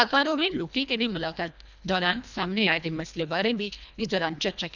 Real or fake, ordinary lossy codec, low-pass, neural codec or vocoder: fake; none; 7.2 kHz; codec, 24 kHz, 1.5 kbps, HILCodec